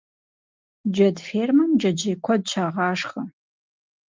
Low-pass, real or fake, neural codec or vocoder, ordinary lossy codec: 7.2 kHz; real; none; Opus, 24 kbps